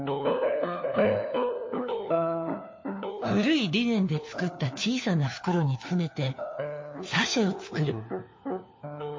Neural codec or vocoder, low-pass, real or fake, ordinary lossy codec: codec, 16 kHz, 4 kbps, FunCodec, trained on LibriTTS, 50 frames a second; 7.2 kHz; fake; MP3, 32 kbps